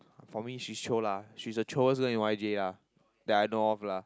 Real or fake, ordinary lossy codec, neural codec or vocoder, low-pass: real; none; none; none